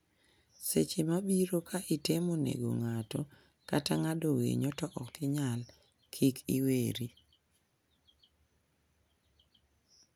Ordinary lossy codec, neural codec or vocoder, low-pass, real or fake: none; none; none; real